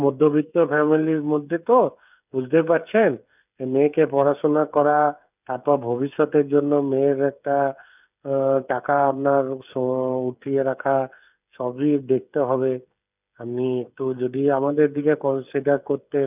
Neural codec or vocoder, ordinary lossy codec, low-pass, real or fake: codec, 16 kHz, 8 kbps, FreqCodec, smaller model; none; 3.6 kHz; fake